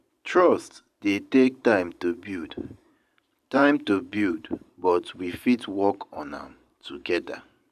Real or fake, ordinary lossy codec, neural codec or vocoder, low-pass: fake; none; vocoder, 44.1 kHz, 128 mel bands every 512 samples, BigVGAN v2; 14.4 kHz